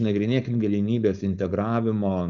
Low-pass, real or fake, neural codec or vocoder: 7.2 kHz; fake; codec, 16 kHz, 4.8 kbps, FACodec